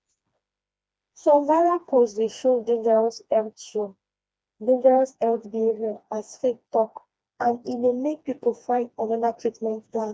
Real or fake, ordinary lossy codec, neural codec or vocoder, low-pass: fake; none; codec, 16 kHz, 2 kbps, FreqCodec, smaller model; none